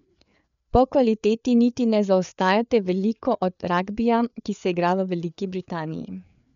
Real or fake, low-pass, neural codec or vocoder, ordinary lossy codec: fake; 7.2 kHz; codec, 16 kHz, 4 kbps, FreqCodec, larger model; none